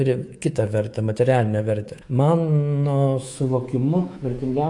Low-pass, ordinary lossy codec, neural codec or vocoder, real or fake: 10.8 kHz; AAC, 48 kbps; codec, 24 kHz, 3.1 kbps, DualCodec; fake